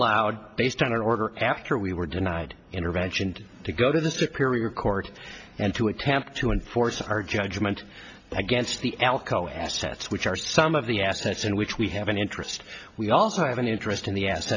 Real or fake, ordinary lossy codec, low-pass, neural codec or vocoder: real; AAC, 48 kbps; 7.2 kHz; none